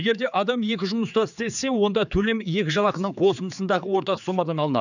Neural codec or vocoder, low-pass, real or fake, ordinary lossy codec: codec, 16 kHz, 4 kbps, X-Codec, HuBERT features, trained on general audio; 7.2 kHz; fake; none